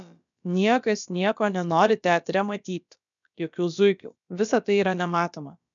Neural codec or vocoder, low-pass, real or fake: codec, 16 kHz, about 1 kbps, DyCAST, with the encoder's durations; 7.2 kHz; fake